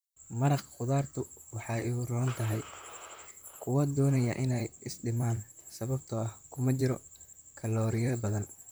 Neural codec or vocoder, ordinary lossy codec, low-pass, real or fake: vocoder, 44.1 kHz, 128 mel bands, Pupu-Vocoder; none; none; fake